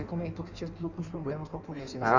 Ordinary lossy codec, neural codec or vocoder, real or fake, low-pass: none; codec, 16 kHz in and 24 kHz out, 1.1 kbps, FireRedTTS-2 codec; fake; 7.2 kHz